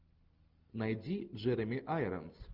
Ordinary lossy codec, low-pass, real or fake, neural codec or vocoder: Opus, 32 kbps; 5.4 kHz; real; none